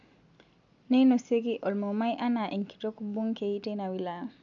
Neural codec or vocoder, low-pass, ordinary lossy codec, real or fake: none; 7.2 kHz; none; real